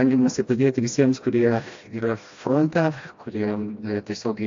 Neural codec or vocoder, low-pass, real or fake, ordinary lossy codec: codec, 16 kHz, 1 kbps, FreqCodec, smaller model; 7.2 kHz; fake; AAC, 48 kbps